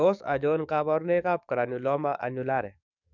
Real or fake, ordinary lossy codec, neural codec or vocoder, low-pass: fake; none; codec, 44.1 kHz, 7.8 kbps, DAC; 7.2 kHz